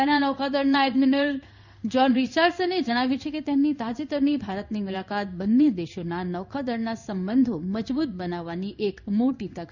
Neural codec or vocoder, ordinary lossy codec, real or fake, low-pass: codec, 16 kHz in and 24 kHz out, 1 kbps, XY-Tokenizer; none; fake; 7.2 kHz